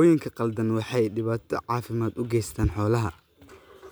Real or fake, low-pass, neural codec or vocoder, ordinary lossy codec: real; none; none; none